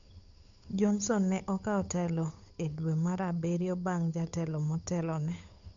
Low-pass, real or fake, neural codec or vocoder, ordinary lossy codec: 7.2 kHz; fake; codec, 16 kHz, 8 kbps, FunCodec, trained on Chinese and English, 25 frames a second; MP3, 64 kbps